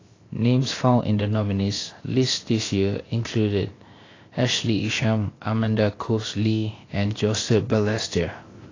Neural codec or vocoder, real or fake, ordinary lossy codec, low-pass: codec, 16 kHz, 0.7 kbps, FocalCodec; fake; AAC, 32 kbps; 7.2 kHz